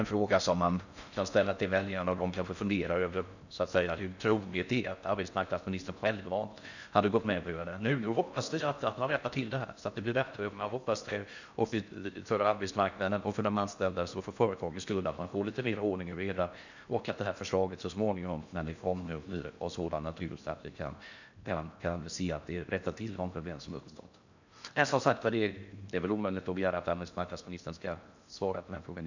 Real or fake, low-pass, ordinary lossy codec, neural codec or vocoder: fake; 7.2 kHz; none; codec, 16 kHz in and 24 kHz out, 0.6 kbps, FocalCodec, streaming, 4096 codes